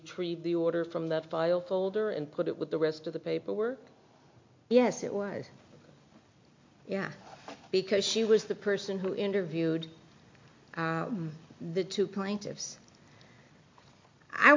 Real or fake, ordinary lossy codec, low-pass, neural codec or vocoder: real; MP3, 48 kbps; 7.2 kHz; none